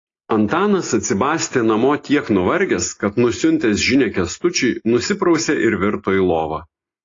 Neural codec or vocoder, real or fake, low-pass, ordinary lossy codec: none; real; 7.2 kHz; AAC, 32 kbps